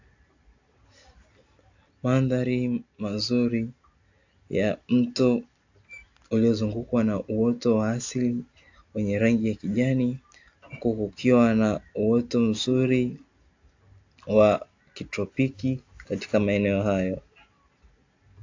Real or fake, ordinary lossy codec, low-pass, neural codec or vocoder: real; AAC, 48 kbps; 7.2 kHz; none